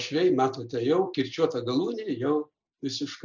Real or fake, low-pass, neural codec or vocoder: real; 7.2 kHz; none